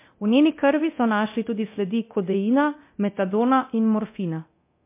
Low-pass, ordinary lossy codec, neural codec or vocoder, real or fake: 3.6 kHz; MP3, 24 kbps; codec, 24 kHz, 0.9 kbps, DualCodec; fake